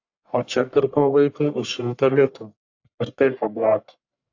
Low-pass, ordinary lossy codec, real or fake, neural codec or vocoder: 7.2 kHz; AAC, 48 kbps; fake; codec, 44.1 kHz, 1.7 kbps, Pupu-Codec